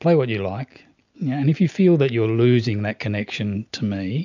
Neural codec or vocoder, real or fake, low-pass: none; real; 7.2 kHz